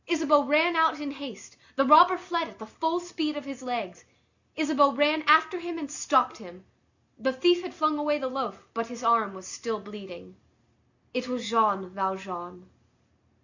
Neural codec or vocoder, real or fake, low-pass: none; real; 7.2 kHz